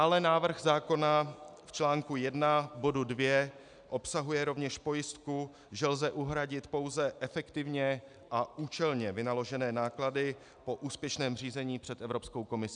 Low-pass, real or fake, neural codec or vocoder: 9.9 kHz; real; none